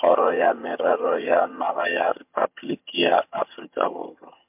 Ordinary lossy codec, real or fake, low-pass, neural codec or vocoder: MP3, 32 kbps; fake; 3.6 kHz; vocoder, 22.05 kHz, 80 mel bands, HiFi-GAN